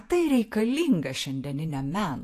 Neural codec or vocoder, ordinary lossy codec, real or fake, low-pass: vocoder, 48 kHz, 128 mel bands, Vocos; AAC, 64 kbps; fake; 14.4 kHz